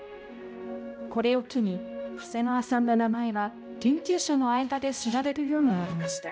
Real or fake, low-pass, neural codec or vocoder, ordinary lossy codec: fake; none; codec, 16 kHz, 0.5 kbps, X-Codec, HuBERT features, trained on balanced general audio; none